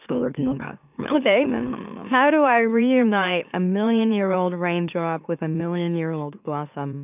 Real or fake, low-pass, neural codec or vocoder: fake; 3.6 kHz; autoencoder, 44.1 kHz, a latent of 192 numbers a frame, MeloTTS